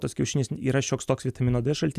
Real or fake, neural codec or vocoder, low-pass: real; none; 14.4 kHz